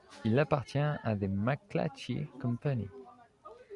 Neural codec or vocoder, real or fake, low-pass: none; real; 10.8 kHz